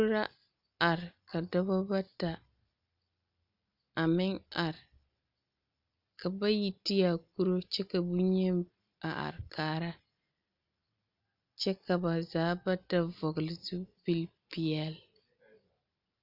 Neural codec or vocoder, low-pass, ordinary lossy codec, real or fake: none; 5.4 kHz; Opus, 64 kbps; real